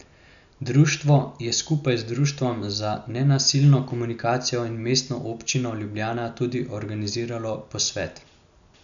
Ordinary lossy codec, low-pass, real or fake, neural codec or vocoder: none; 7.2 kHz; real; none